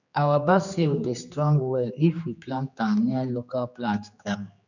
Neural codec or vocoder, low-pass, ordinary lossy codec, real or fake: codec, 16 kHz, 2 kbps, X-Codec, HuBERT features, trained on general audio; 7.2 kHz; none; fake